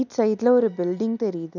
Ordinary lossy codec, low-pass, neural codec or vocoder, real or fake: none; 7.2 kHz; none; real